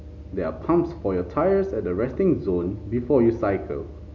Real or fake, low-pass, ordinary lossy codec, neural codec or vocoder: real; 7.2 kHz; none; none